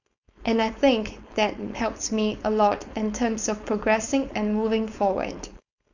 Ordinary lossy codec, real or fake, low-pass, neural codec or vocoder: none; fake; 7.2 kHz; codec, 16 kHz, 4.8 kbps, FACodec